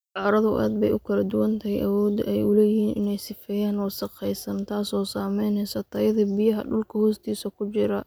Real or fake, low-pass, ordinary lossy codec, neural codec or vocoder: real; none; none; none